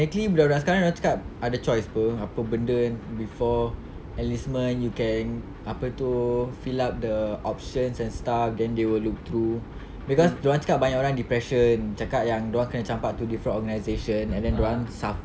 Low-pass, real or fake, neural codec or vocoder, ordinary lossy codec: none; real; none; none